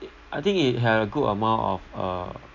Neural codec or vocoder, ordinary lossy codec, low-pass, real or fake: none; none; 7.2 kHz; real